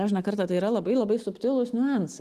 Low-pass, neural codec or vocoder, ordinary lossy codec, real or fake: 14.4 kHz; none; Opus, 24 kbps; real